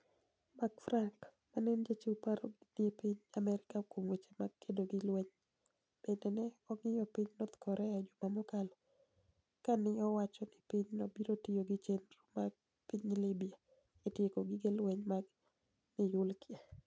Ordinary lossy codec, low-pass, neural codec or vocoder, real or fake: none; none; none; real